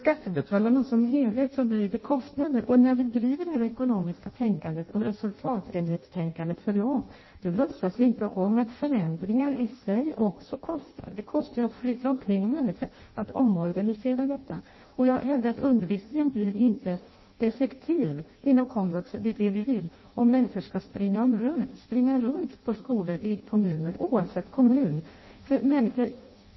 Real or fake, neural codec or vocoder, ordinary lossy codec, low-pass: fake; codec, 16 kHz in and 24 kHz out, 0.6 kbps, FireRedTTS-2 codec; MP3, 24 kbps; 7.2 kHz